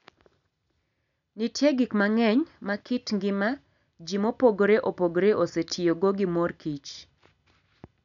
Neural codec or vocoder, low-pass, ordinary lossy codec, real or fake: none; 7.2 kHz; none; real